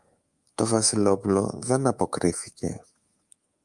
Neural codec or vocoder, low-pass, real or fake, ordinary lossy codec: codec, 24 kHz, 3.1 kbps, DualCodec; 10.8 kHz; fake; Opus, 32 kbps